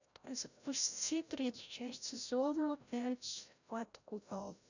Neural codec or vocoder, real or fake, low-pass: codec, 16 kHz, 0.5 kbps, FreqCodec, larger model; fake; 7.2 kHz